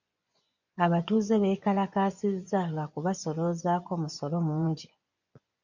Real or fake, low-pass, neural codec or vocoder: fake; 7.2 kHz; vocoder, 24 kHz, 100 mel bands, Vocos